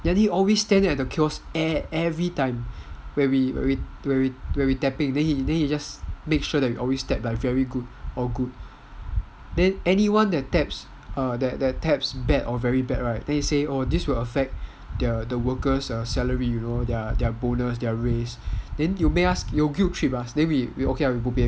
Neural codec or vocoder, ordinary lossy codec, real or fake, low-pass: none; none; real; none